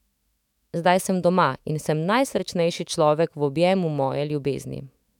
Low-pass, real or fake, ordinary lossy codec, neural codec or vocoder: 19.8 kHz; fake; none; autoencoder, 48 kHz, 128 numbers a frame, DAC-VAE, trained on Japanese speech